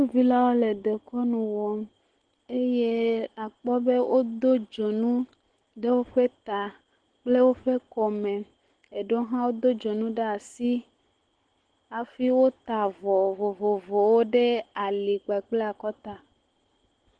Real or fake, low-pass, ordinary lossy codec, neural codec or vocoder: real; 9.9 kHz; Opus, 16 kbps; none